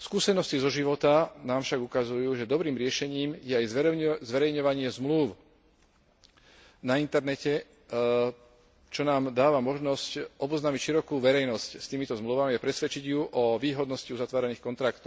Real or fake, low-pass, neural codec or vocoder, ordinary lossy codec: real; none; none; none